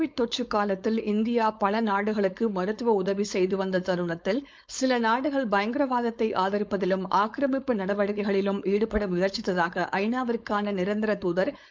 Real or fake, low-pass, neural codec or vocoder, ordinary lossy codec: fake; none; codec, 16 kHz, 4.8 kbps, FACodec; none